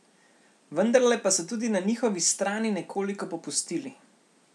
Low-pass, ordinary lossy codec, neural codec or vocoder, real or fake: none; none; none; real